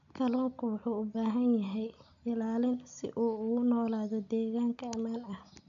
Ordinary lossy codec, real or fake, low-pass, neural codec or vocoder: none; real; 7.2 kHz; none